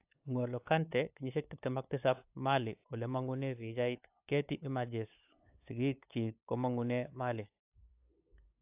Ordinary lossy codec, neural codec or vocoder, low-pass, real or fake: AAC, 32 kbps; codec, 16 kHz, 8 kbps, FunCodec, trained on LibriTTS, 25 frames a second; 3.6 kHz; fake